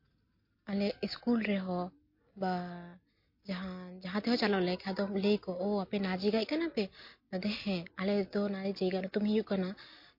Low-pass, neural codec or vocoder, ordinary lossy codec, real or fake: 5.4 kHz; none; MP3, 32 kbps; real